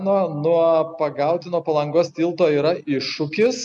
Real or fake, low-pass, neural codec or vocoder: real; 10.8 kHz; none